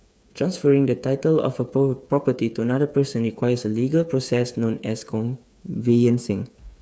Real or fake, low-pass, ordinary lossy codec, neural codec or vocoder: fake; none; none; codec, 16 kHz, 8 kbps, FreqCodec, smaller model